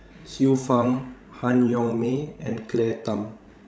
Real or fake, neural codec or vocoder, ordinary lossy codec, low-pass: fake; codec, 16 kHz, 16 kbps, FreqCodec, larger model; none; none